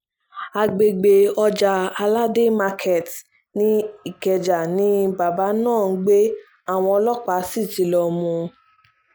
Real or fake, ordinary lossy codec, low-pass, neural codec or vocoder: real; none; none; none